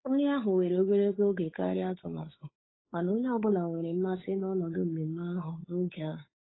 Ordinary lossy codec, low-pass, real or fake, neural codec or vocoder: AAC, 16 kbps; 7.2 kHz; fake; codec, 16 kHz, 8 kbps, FunCodec, trained on Chinese and English, 25 frames a second